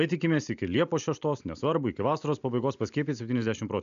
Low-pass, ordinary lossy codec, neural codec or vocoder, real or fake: 7.2 kHz; AAC, 96 kbps; none; real